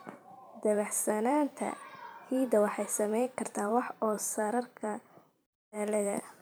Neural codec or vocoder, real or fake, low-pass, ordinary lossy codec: vocoder, 44.1 kHz, 128 mel bands every 512 samples, BigVGAN v2; fake; none; none